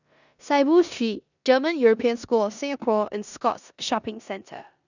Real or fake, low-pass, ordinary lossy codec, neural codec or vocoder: fake; 7.2 kHz; none; codec, 16 kHz in and 24 kHz out, 0.9 kbps, LongCat-Audio-Codec, four codebook decoder